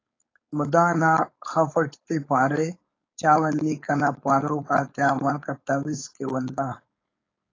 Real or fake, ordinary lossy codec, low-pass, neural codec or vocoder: fake; AAC, 32 kbps; 7.2 kHz; codec, 16 kHz, 4.8 kbps, FACodec